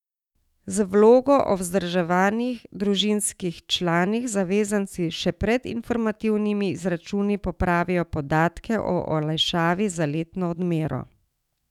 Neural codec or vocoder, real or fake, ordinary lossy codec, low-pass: autoencoder, 48 kHz, 128 numbers a frame, DAC-VAE, trained on Japanese speech; fake; none; 19.8 kHz